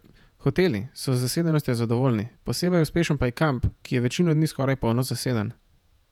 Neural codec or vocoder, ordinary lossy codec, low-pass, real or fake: vocoder, 48 kHz, 128 mel bands, Vocos; none; 19.8 kHz; fake